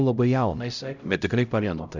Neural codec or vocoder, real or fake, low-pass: codec, 16 kHz, 0.5 kbps, X-Codec, HuBERT features, trained on LibriSpeech; fake; 7.2 kHz